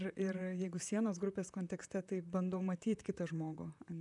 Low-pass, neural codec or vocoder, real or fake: 10.8 kHz; vocoder, 44.1 kHz, 128 mel bands, Pupu-Vocoder; fake